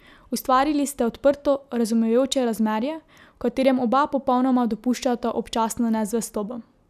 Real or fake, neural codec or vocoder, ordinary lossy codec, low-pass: real; none; none; 14.4 kHz